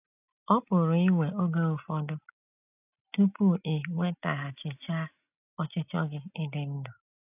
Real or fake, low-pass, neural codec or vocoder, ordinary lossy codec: real; 3.6 kHz; none; none